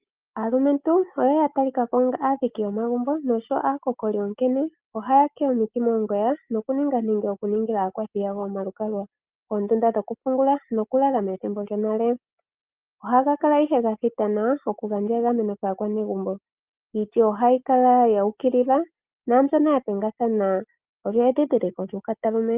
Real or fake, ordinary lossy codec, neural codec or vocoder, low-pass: real; Opus, 32 kbps; none; 3.6 kHz